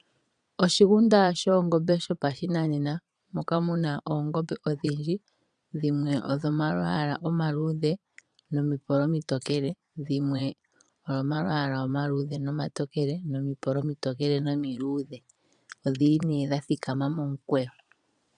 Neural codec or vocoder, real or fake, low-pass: vocoder, 22.05 kHz, 80 mel bands, Vocos; fake; 9.9 kHz